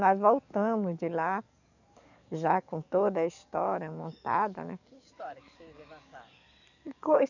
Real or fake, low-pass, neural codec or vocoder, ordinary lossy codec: fake; 7.2 kHz; codec, 44.1 kHz, 7.8 kbps, DAC; none